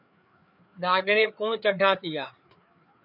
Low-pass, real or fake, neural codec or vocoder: 5.4 kHz; fake; codec, 16 kHz, 4 kbps, FreqCodec, larger model